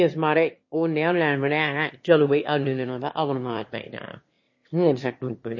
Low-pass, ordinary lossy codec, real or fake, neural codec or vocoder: 7.2 kHz; MP3, 32 kbps; fake; autoencoder, 22.05 kHz, a latent of 192 numbers a frame, VITS, trained on one speaker